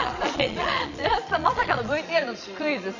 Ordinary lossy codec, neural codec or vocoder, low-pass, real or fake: none; vocoder, 22.05 kHz, 80 mel bands, Vocos; 7.2 kHz; fake